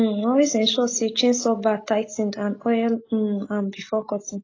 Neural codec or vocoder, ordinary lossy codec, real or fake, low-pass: none; AAC, 32 kbps; real; 7.2 kHz